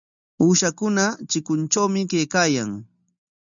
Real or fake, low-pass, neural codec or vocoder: real; 7.2 kHz; none